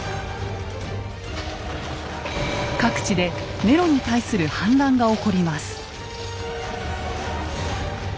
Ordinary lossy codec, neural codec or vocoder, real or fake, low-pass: none; none; real; none